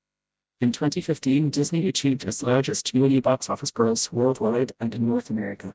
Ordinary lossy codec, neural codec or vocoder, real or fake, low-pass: none; codec, 16 kHz, 0.5 kbps, FreqCodec, smaller model; fake; none